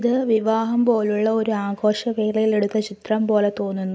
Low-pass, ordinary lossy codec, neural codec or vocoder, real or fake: none; none; none; real